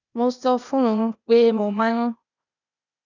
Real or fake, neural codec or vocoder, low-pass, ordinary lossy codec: fake; codec, 16 kHz, 0.8 kbps, ZipCodec; 7.2 kHz; none